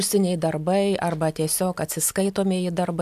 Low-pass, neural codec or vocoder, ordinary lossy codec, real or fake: 14.4 kHz; none; AAC, 96 kbps; real